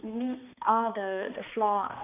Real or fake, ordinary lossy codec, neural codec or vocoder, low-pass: fake; none; codec, 16 kHz, 2 kbps, X-Codec, HuBERT features, trained on balanced general audio; 3.6 kHz